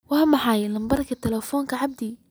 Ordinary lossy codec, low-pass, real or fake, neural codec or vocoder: none; none; real; none